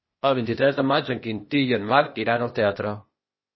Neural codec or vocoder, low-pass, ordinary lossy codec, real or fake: codec, 16 kHz, 0.8 kbps, ZipCodec; 7.2 kHz; MP3, 24 kbps; fake